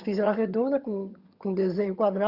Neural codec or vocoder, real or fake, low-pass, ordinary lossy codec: vocoder, 22.05 kHz, 80 mel bands, HiFi-GAN; fake; 5.4 kHz; Opus, 64 kbps